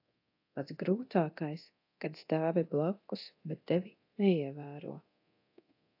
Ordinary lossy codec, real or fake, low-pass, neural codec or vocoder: AAC, 32 kbps; fake; 5.4 kHz; codec, 24 kHz, 0.9 kbps, DualCodec